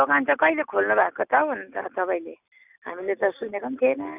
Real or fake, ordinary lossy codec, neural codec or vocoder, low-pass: real; none; none; 3.6 kHz